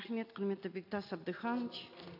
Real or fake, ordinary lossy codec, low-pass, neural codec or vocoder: real; AAC, 48 kbps; 5.4 kHz; none